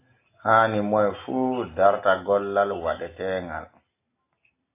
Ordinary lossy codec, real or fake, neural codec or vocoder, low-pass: AAC, 24 kbps; real; none; 3.6 kHz